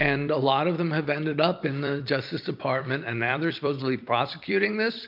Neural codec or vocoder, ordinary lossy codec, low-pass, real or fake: vocoder, 44.1 kHz, 128 mel bands every 256 samples, BigVGAN v2; MP3, 48 kbps; 5.4 kHz; fake